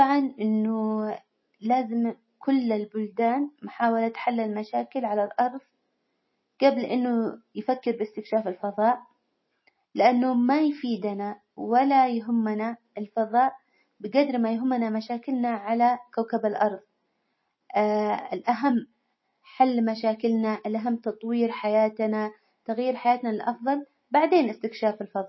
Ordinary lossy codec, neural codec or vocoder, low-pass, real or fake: MP3, 24 kbps; none; 7.2 kHz; real